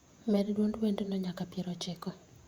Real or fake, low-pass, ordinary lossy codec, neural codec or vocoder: fake; 19.8 kHz; Opus, 64 kbps; vocoder, 48 kHz, 128 mel bands, Vocos